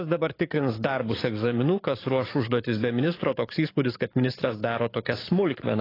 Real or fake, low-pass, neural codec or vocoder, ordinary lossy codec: fake; 5.4 kHz; codec, 44.1 kHz, 7.8 kbps, DAC; AAC, 24 kbps